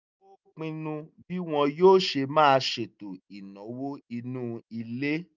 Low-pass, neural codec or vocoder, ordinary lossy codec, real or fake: 7.2 kHz; none; none; real